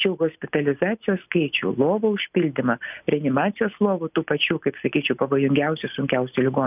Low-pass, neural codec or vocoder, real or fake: 3.6 kHz; none; real